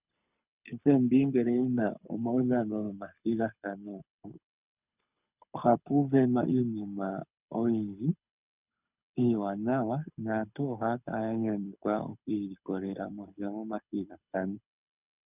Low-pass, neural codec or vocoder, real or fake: 3.6 kHz; codec, 24 kHz, 6 kbps, HILCodec; fake